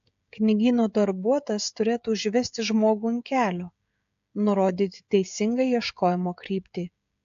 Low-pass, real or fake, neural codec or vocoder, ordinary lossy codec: 7.2 kHz; fake; codec, 16 kHz, 16 kbps, FreqCodec, smaller model; AAC, 64 kbps